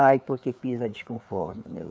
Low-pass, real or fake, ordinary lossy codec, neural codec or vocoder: none; fake; none; codec, 16 kHz, 4 kbps, FreqCodec, larger model